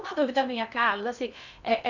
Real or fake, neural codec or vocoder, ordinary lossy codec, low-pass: fake; codec, 16 kHz in and 24 kHz out, 0.6 kbps, FocalCodec, streaming, 2048 codes; none; 7.2 kHz